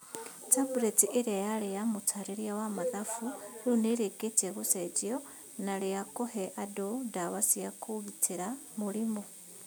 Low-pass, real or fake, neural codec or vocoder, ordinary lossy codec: none; real; none; none